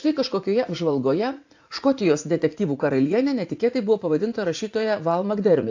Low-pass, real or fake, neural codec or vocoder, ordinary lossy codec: 7.2 kHz; fake; vocoder, 44.1 kHz, 80 mel bands, Vocos; AAC, 48 kbps